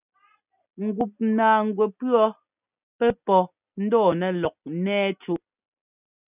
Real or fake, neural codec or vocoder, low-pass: real; none; 3.6 kHz